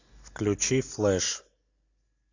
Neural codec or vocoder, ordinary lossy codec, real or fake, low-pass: none; AAC, 48 kbps; real; 7.2 kHz